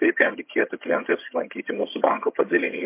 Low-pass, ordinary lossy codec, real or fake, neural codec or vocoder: 3.6 kHz; MP3, 24 kbps; fake; vocoder, 22.05 kHz, 80 mel bands, HiFi-GAN